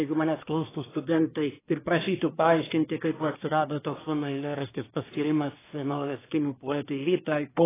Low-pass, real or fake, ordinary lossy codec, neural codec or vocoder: 3.6 kHz; fake; AAC, 16 kbps; codec, 24 kHz, 1 kbps, SNAC